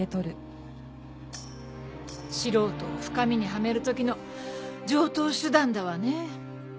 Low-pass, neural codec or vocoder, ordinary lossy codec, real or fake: none; none; none; real